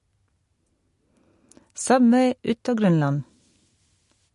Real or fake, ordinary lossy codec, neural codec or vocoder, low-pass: real; MP3, 48 kbps; none; 14.4 kHz